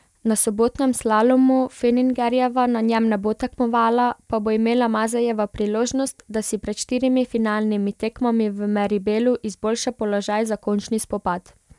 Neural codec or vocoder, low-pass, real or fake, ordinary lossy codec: none; none; real; none